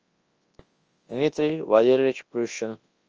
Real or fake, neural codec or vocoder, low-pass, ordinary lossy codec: fake; codec, 24 kHz, 0.9 kbps, WavTokenizer, large speech release; 7.2 kHz; Opus, 24 kbps